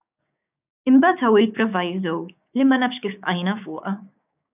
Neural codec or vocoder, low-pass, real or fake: codec, 16 kHz, 6 kbps, DAC; 3.6 kHz; fake